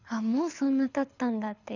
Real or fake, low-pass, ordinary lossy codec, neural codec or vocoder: fake; 7.2 kHz; none; codec, 24 kHz, 6 kbps, HILCodec